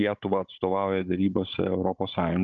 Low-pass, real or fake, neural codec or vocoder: 7.2 kHz; fake; codec, 16 kHz, 16 kbps, FunCodec, trained on Chinese and English, 50 frames a second